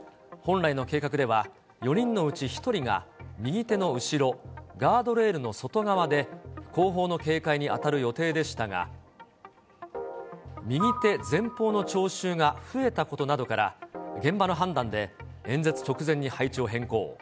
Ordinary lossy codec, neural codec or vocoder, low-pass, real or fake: none; none; none; real